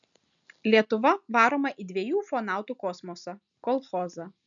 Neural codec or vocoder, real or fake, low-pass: none; real; 7.2 kHz